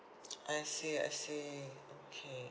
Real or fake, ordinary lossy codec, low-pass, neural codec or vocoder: real; none; none; none